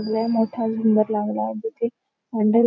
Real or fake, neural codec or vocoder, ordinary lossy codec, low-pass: real; none; none; 7.2 kHz